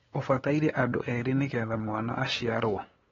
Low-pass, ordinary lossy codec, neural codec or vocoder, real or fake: 7.2 kHz; AAC, 24 kbps; codec, 16 kHz, 8 kbps, FunCodec, trained on LibriTTS, 25 frames a second; fake